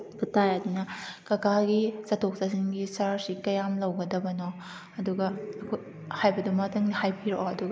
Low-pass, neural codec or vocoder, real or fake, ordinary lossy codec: none; none; real; none